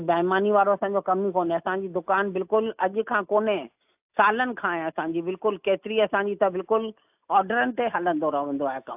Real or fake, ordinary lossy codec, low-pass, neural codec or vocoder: real; AAC, 32 kbps; 3.6 kHz; none